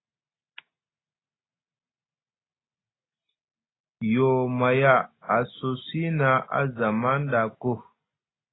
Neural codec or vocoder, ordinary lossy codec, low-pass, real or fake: none; AAC, 16 kbps; 7.2 kHz; real